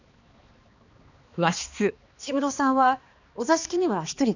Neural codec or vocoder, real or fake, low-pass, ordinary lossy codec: codec, 16 kHz, 2 kbps, X-Codec, HuBERT features, trained on balanced general audio; fake; 7.2 kHz; none